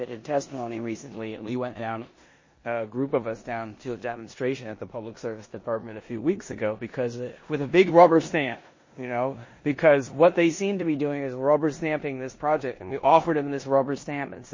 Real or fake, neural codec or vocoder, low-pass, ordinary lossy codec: fake; codec, 16 kHz in and 24 kHz out, 0.9 kbps, LongCat-Audio-Codec, four codebook decoder; 7.2 kHz; MP3, 32 kbps